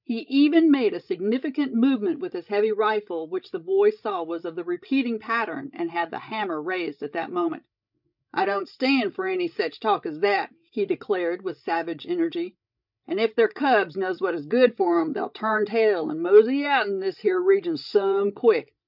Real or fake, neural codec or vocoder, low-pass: fake; codec, 16 kHz, 16 kbps, FreqCodec, larger model; 5.4 kHz